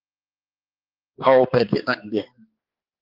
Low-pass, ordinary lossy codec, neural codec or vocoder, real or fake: 5.4 kHz; Opus, 24 kbps; codec, 16 kHz, 2 kbps, X-Codec, HuBERT features, trained on balanced general audio; fake